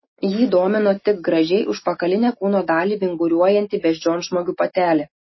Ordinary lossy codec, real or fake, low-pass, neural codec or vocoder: MP3, 24 kbps; real; 7.2 kHz; none